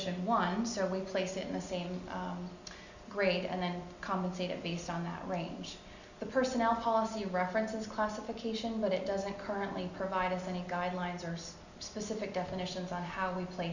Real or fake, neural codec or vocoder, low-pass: real; none; 7.2 kHz